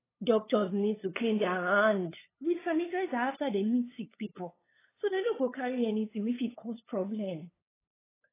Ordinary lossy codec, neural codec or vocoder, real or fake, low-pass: AAC, 16 kbps; codec, 16 kHz, 8 kbps, FunCodec, trained on LibriTTS, 25 frames a second; fake; 3.6 kHz